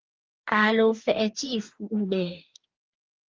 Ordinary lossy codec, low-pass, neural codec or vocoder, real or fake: Opus, 32 kbps; 7.2 kHz; codec, 44.1 kHz, 2.6 kbps, DAC; fake